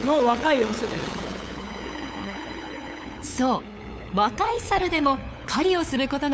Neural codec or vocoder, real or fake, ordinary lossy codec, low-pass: codec, 16 kHz, 8 kbps, FunCodec, trained on LibriTTS, 25 frames a second; fake; none; none